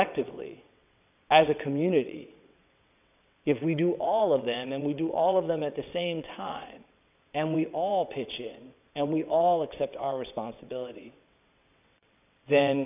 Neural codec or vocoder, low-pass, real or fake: vocoder, 22.05 kHz, 80 mel bands, WaveNeXt; 3.6 kHz; fake